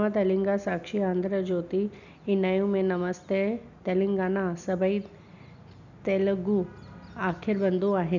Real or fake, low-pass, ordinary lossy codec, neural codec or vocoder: real; 7.2 kHz; none; none